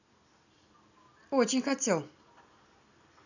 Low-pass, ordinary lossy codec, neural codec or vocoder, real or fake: 7.2 kHz; none; none; real